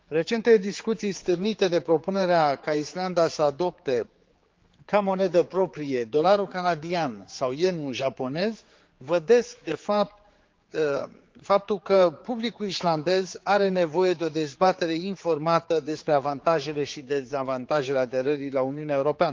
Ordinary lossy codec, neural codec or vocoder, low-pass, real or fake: Opus, 32 kbps; codec, 16 kHz, 4 kbps, X-Codec, HuBERT features, trained on general audio; 7.2 kHz; fake